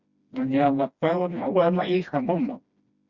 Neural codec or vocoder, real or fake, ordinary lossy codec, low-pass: codec, 16 kHz, 1 kbps, FreqCodec, smaller model; fake; Opus, 64 kbps; 7.2 kHz